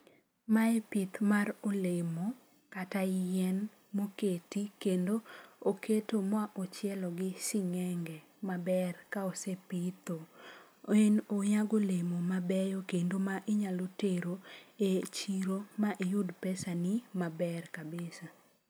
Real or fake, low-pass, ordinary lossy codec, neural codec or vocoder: real; none; none; none